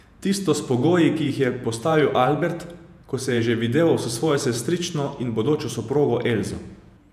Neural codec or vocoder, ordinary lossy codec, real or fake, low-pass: vocoder, 48 kHz, 128 mel bands, Vocos; none; fake; 14.4 kHz